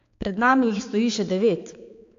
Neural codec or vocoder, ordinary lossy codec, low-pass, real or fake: codec, 16 kHz, 4 kbps, X-Codec, HuBERT features, trained on balanced general audio; AAC, 48 kbps; 7.2 kHz; fake